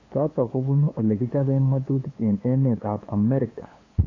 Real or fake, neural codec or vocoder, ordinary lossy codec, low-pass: fake; codec, 16 kHz, 2 kbps, FunCodec, trained on LibriTTS, 25 frames a second; AAC, 32 kbps; 7.2 kHz